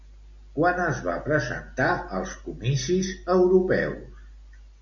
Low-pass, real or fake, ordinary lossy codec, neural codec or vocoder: 7.2 kHz; real; MP3, 48 kbps; none